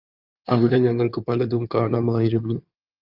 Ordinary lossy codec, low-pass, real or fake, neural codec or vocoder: Opus, 24 kbps; 5.4 kHz; fake; codec, 16 kHz in and 24 kHz out, 2.2 kbps, FireRedTTS-2 codec